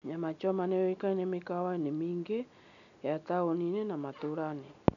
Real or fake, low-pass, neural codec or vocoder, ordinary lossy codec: real; 7.2 kHz; none; none